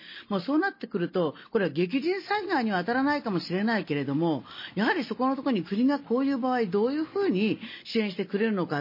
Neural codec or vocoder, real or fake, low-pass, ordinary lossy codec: none; real; 5.4 kHz; MP3, 24 kbps